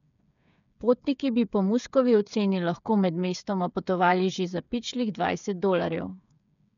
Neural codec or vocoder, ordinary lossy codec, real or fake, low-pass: codec, 16 kHz, 8 kbps, FreqCodec, smaller model; none; fake; 7.2 kHz